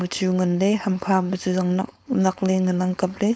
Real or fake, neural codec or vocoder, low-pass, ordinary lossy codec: fake; codec, 16 kHz, 4.8 kbps, FACodec; none; none